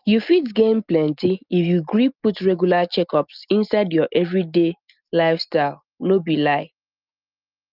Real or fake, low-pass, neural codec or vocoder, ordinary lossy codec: real; 5.4 kHz; none; Opus, 24 kbps